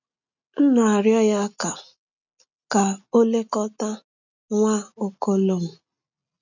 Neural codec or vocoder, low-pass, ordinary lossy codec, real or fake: none; 7.2 kHz; none; real